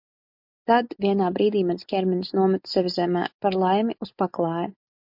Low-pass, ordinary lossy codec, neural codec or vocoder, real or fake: 5.4 kHz; MP3, 48 kbps; none; real